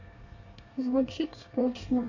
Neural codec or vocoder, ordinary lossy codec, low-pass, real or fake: codec, 24 kHz, 1 kbps, SNAC; AAC, 48 kbps; 7.2 kHz; fake